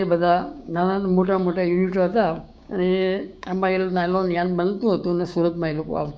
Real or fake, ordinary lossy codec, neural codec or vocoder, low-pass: fake; none; codec, 16 kHz, 6 kbps, DAC; none